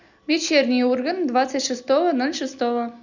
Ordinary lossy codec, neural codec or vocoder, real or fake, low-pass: none; none; real; 7.2 kHz